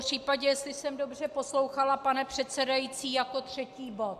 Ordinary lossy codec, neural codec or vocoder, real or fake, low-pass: AAC, 96 kbps; none; real; 14.4 kHz